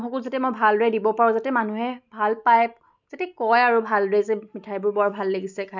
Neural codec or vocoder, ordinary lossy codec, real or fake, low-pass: none; none; real; 7.2 kHz